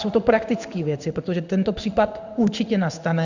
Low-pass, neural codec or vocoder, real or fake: 7.2 kHz; codec, 16 kHz in and 24 kHz out, 1 kbps, XY-Tokenizer; fake